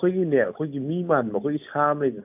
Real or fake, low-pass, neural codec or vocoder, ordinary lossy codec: real; 3.6 kHz; none; AAC, 32 kbps